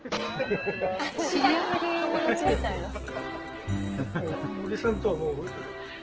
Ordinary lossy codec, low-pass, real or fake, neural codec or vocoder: Opus, 16 kbps; 7.2 kHz; real; none